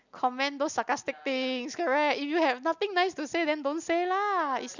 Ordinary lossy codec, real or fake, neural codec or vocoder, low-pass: none; real; none; 7.2 kHz